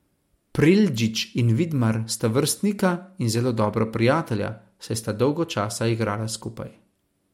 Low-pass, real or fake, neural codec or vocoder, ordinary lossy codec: 19.8 kHz; real; none; MP3, 64 kbps